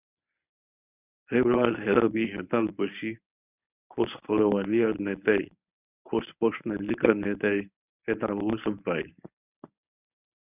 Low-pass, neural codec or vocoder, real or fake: 3.6 kHz; codec, 24 kHz, 0.9 kbps, WavTokenizer, medium speech release version 1; fake